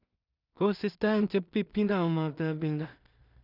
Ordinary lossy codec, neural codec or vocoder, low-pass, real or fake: none; codec, 16 kHz in and 24 kHz out, 0.4 kbps, LongCat-Audio-Codec, two codebook decoder; 5.4 kHz; fake